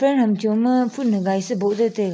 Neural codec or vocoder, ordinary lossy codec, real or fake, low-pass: none; none; real; none